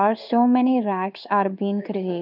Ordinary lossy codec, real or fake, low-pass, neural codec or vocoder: none; fake; 5.4 kHz; codec, 16 kHz in and 24 kHz out, 1 kbps, XY-Tokenizer